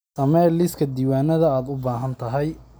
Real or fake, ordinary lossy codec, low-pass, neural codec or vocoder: real; none; none; none